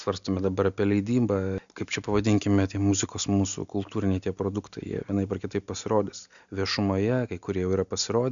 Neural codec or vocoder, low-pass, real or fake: none; 7.2 kHz; real